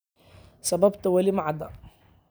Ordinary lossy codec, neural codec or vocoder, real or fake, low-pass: none; none; real; none